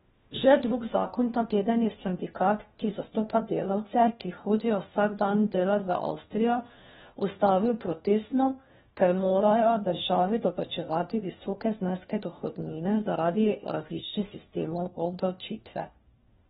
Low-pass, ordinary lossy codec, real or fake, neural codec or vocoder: 7.2 kHz; AAC, 16 kbps; fake; codec, 16 kHz, 1 kbps, FunCodec, trained on LibriTTS, 50 frames a second